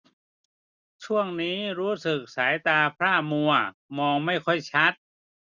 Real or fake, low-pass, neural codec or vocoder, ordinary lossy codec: real; 7.2 kHz; none; none